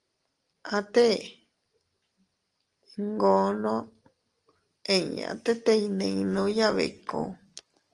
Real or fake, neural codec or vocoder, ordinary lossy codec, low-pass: real; none; Opus, 24 kbps; 10.8 kHz